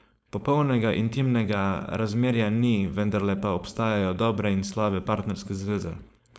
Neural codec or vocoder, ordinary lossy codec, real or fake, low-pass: codec, 16 kHz, 4.8 kbps, FACodec; none; fake; none